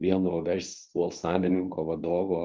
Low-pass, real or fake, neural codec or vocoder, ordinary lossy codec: 7.2 kHz; fake; codec, 24 kHz, 0.9 kbps, WavTokenizer, medium speech release version 2; Opus, 32 kbps